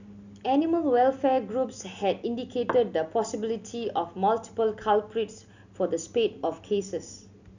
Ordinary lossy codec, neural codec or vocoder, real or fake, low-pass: none; none; real; 7.2 kHz